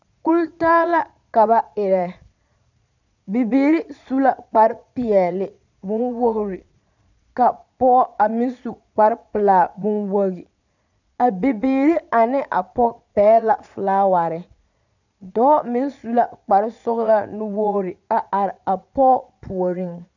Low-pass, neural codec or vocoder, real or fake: 7.2 kHz; vocoder, 22.05 kHz, 80 mel bands, Vocos; fake